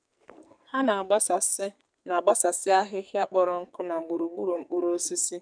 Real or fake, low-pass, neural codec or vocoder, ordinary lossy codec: fake; 9.9 kHz; codec, 44.1 kHz, 2.6 kbps, SNAC; none